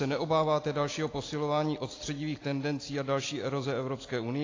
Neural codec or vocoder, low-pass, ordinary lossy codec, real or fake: none; 7.2 kHz; AAC, 32 kbps; real